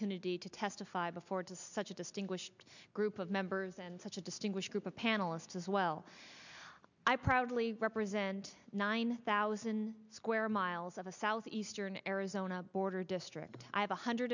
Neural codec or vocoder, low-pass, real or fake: none; 7.2 kHz; real